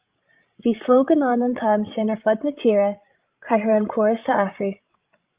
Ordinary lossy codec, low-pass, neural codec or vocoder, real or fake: Opus, 24 kbps; 3.6 kHz; codec, 16 kHz, 16 kbps, FreqCodec, larger model; fake